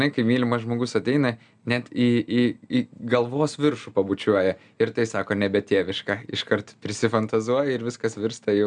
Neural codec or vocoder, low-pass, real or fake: none; 9.9 kHz; real